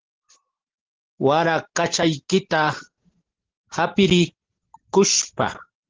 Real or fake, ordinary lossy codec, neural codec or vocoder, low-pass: real; Opus, 16 kbps; none; 7.2 kHz